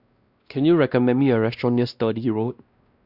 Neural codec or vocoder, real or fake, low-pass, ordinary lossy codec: codec, 16 kHz, 1 kbps, X-Codec, WavLM features, trained on Multilingual LibriSpeech; fake; 5.4 kHz; Opus, 64 kbps